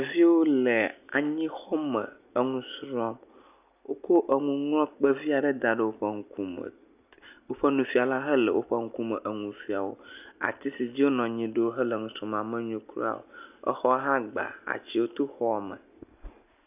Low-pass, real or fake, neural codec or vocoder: 3.6 kHz; real; none